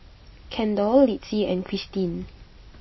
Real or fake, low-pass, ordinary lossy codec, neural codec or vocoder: real; 7.2 kHz; MP3, 24 kbps; none